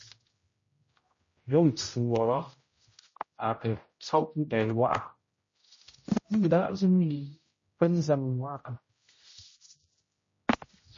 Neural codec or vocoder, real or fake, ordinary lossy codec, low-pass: codec, 16 kHz, 0.5 kbps, X-Codec, HuBERT features, trained on general audio; fake; MP3, 32 kbps; 7.2 kHz